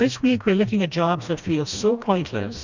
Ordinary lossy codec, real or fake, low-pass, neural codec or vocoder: Opus, 64 kbps; fake; 7.2 kHz; codec, 16 kHz, 1 kbps, FreqCodec, smaller model